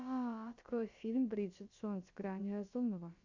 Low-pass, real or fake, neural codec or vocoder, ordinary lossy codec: 7.2 kHz; fake; codec, 16 kHz, about 1 kbps, DyCAST, with the encoder's durations; Opus, 64 kbps